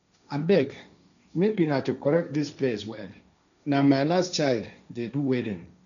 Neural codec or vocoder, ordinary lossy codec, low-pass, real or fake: codec, 16 kHz, 1.1 kbps, Voila-Tokenizer; none; 7.2 kHz; fake